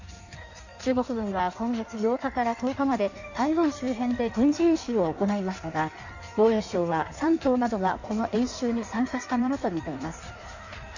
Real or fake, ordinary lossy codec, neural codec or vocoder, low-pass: fake; none; codec, 16 kHz in and 24 kHz out, 1.1 kbps, FireRedTTS-2 codec; 7.2 kHz